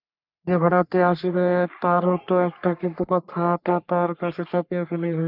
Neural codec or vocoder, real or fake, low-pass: codec, 44.1 kHz, 3.4 kbps, Pupu-Codec; fake; 5.4 kHz